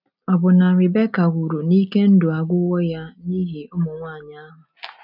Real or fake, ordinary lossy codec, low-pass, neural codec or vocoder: real; none; 5.4 kHz; none